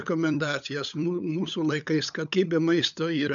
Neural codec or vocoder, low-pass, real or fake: codec, 16 kHz, 8 kbps, FunCodec, trained on LibriTTS, 25 frames a second; 7.2 kHz; fake